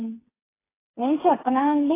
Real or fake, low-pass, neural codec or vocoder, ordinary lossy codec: fake; 3.6 kHz; codec, 16 kHz, 4 kbps, FreqCodec, smaller model; AAC, 24 kbps